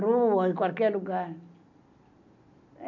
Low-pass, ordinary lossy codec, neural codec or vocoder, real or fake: 7.2 kHz; none; none; real